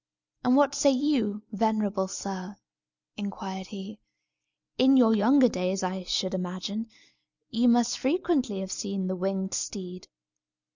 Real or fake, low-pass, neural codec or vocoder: real; 7.2 kHz; none